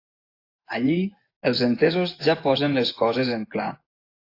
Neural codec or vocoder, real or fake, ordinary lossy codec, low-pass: vocoder, 24 kHz, 100 mel bands, Vocos; fake; AAC, 32 kbps; 5.4 kHz